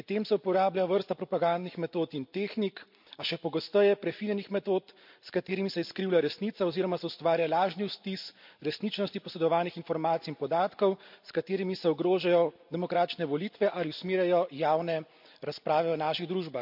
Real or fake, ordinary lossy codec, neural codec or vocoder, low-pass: real; MP3, 48 kbps; none; 5.4 kHz